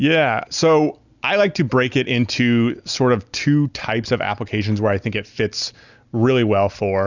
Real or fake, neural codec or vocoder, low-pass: real; none; 7.2 kHz